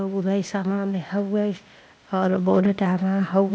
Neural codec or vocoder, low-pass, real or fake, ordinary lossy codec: codec, 16 kHz, 0.8 kbps, ZipCodec; none; fake; none